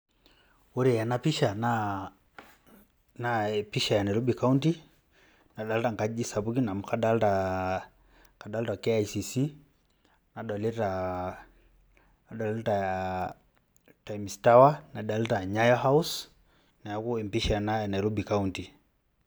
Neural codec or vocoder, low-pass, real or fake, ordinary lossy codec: none; none; real; none